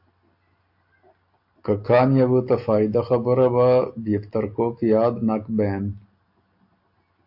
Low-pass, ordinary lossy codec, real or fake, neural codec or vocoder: 5.4 kHz; AAC, 48 kbps; real; none